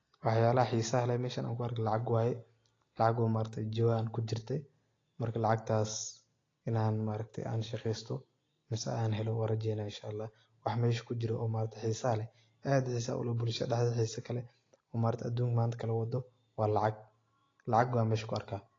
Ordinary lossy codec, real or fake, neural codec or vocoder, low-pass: AAC, 32 kbps; real; none; 7.2 kHz